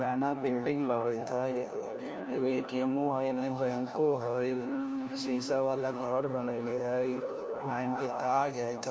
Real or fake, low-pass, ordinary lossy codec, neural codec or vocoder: fake; none; none; codec, 16 kHz, 1 kbps, FunCodec, trained on LibriTTS, 50 frames a second